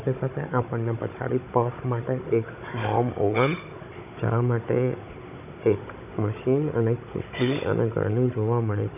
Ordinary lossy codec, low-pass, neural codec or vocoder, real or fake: none; 3.6 kHz; codec, 16 kHz, 16 kbps, FunCodec, trained on Chinese and English, 50 frames a second; fake